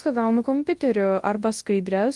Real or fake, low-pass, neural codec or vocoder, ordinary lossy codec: fake; 10.8 kHz; codec, 24 kHz, 0.9 kbps, WavTokenizer, large speech release; Opus, 24 kbps